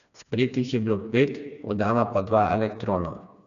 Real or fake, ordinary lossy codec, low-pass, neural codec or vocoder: fake; none; 7.2 kHz; codec, 16 kHz, 2 kbps, FreqCodec, smaller model